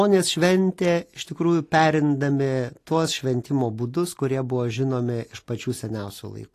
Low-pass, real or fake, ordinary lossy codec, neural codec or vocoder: 14.4 kHz; real; AAC, 48 kbps; none